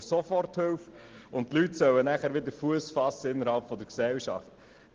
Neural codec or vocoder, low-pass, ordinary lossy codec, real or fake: none; 7.2 kHz; Opus, 16 kbps; real